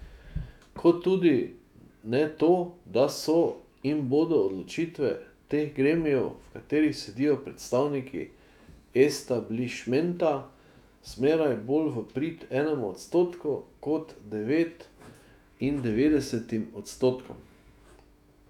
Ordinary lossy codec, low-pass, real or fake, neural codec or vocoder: none; 19.8 kHz; fake; autoencoder, 48 kHz, 128 numbers a frame, DAC-VAE, trained on Japanese speech